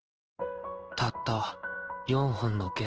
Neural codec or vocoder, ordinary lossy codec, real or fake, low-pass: codec, 16 kHz in and 24 kHz out, 1 kbps, XY-Tokenizer; Opus, 16 kbps; fake; 7.2 kHz